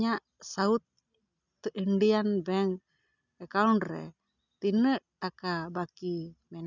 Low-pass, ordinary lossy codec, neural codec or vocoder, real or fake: 7.2 kHz; none; none; real